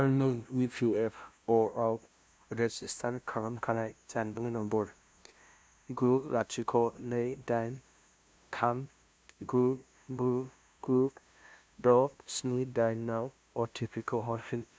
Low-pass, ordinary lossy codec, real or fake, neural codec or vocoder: none; none; fake; codec, 16 kHz, 0.5 kbps, FunCodec, trained on LibriTTS, 25 frames a second